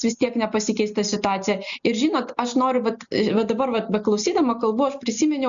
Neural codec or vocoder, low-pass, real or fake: none; 7.2 kHz; real